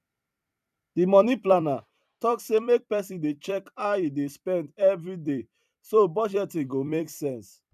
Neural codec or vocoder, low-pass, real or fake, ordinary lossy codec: vocoder, 44.1 kHz, 128 mel bands every 256 samples, BigVGAN v2; 14.4 kHz; fake; none